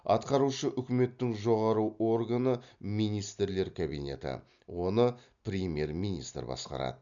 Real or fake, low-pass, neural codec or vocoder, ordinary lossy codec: real; 7.2 kHz; none; MP3, 96 kbps